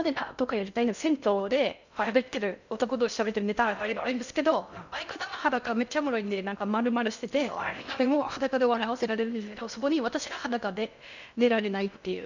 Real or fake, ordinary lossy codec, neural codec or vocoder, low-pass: fake; none; codec, 16 kHz in and 24 kHz out, 0.6 kbps, FocalCodec, streaming, 2048 codes; 7.2 kHz